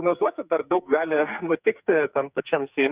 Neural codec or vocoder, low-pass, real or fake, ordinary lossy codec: codec, 44.1 kHz, 2.6 kbps, SNAC; 3.6 kHz; fake; Opus, 64 kbps